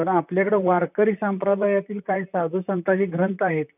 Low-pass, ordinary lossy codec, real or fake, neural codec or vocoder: 3.6 kHz; none; fake; vocoder, 44.1 kHz, 128 mel bands, Pupu-Vocoder